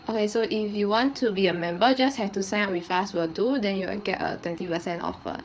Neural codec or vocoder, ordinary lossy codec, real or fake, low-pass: codec, 16 kHz, 4 kbps, FreqCodec, larger model; none; fake; none